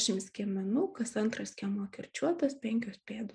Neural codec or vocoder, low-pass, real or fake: none; 9.9 kHz; real